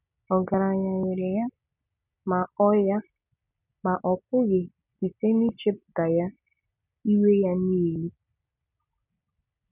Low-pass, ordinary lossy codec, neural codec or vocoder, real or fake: 3.6 kHz; none; none; real